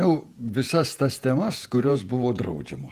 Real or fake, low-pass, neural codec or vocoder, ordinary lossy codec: fake; 14.4 kHz; vocoder, 48 kHz, 128 mel bands, Vocos; Opus, 32 kbps